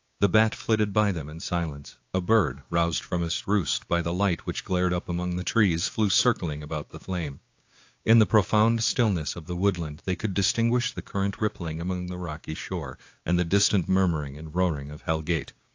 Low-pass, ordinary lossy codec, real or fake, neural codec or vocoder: 7.2 kHz; AAC, 48 kbps; fake; codec, 16 kHz, 6 kbps, DAC